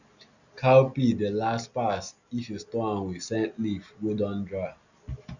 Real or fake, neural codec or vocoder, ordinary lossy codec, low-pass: real; none; none; 7.2 kHz